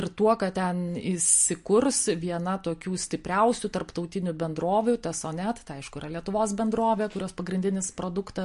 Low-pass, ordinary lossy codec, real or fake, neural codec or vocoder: 14.4 kHz; MP3, 48 kbps; real; none